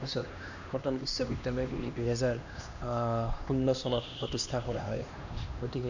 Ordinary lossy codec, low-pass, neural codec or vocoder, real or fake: none; 7.2 kHz; codec, 16 kHz, 2 kbps, X-Codec, HuBERT features, trained on LibriSpeech; fake